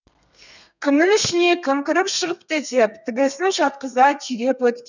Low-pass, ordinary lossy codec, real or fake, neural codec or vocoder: 7.2 kHz; none; fake; codec, 32 kHz, 1.9 kbps, SNAC